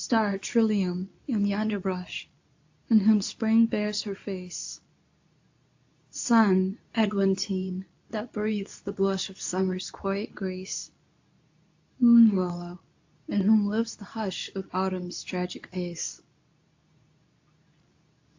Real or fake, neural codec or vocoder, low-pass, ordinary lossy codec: fake; codec, 24 kHz, 0.9 kbps, WavTokenizer, medium speech release version 2; 7.2 kHz; AAC, 48 kbps